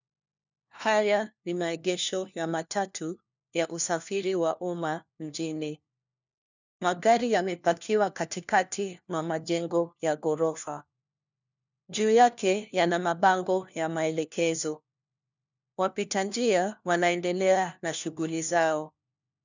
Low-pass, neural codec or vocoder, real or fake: 7.2 kHz; codec, 16 kHz, 1 kbps, FunCodec, trained on LibriTTS, 50 frames a second; fake